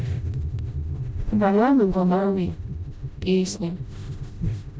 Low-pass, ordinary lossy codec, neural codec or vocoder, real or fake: none; none; codec, 16 kHz, 0.5 kbps, FreqCodec, smaller model; fake